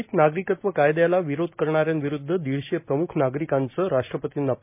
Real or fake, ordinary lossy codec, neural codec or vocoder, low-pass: real; MP3, 32 kbps; none; 3.6 kHz